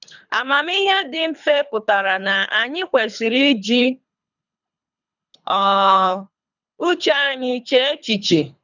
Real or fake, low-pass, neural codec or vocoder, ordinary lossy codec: fake; 7.2 kHz; codec, 24 kHz, 3 kbps, HILCodec; none